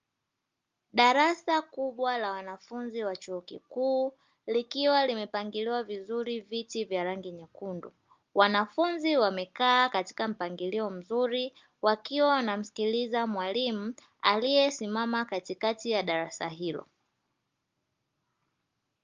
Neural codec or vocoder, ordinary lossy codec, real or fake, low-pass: none; Opus, 32 kbps; real; 7.2 kHz